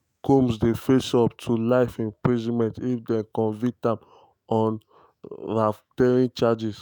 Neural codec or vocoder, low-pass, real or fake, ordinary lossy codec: autoencoder, 48 kHz, 128 numbers a frame, DAC-VAE, trained on Japanese speech; none; fake; none